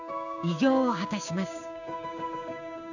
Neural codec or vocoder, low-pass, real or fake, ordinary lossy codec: codec, 16 kHz, 6 kbps, DAC; 7.2 kHz; fake; none